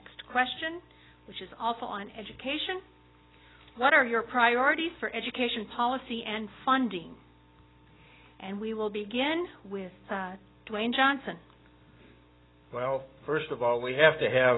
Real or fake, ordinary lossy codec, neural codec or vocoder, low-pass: real; AAC, 16 kbps; none; 7.2 kHz